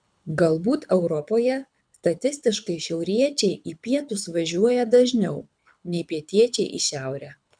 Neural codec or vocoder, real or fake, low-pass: codec, 24 kHz, 6 kbps, HILCodec; fake; 9.9 kHz